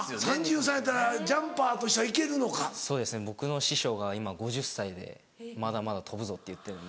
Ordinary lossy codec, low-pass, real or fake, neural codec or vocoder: none; none; real; none